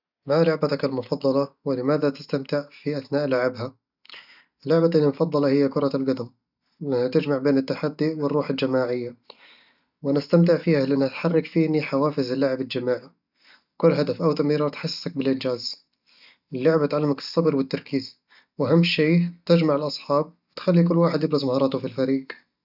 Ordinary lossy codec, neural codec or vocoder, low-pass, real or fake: none; none; 5.4 kHz; real